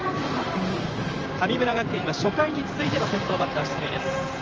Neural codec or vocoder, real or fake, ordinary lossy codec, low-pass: vocoder, 44.1 kHz, 128 mel bands, Pupu-Vocoder; fake; Opus, 24 kbps; 7.2 kHz